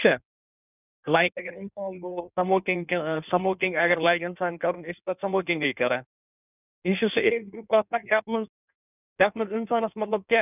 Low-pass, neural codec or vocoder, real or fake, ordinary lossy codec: 3.6 kHz; codec, 16 kHz in and 24 kHz out, 1.1 kbps, FireRedTTS-2 codec; fake; none